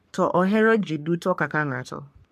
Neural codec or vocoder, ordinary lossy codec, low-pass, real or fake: codec, 44.1 kHz, 3.4 kbps, Pupu-Codec; MP3, 64 kbps; 14.4 kHz; fake